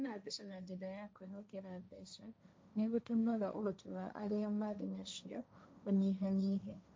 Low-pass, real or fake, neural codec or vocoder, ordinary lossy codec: none; fake; codec, 16 kHz, 1.1 kbps, Voila-Tokenizer; none